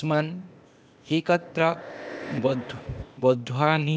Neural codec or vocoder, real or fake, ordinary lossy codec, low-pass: codec, 16 kHz, 0.8 kbps, ZipCodec; fake; none; none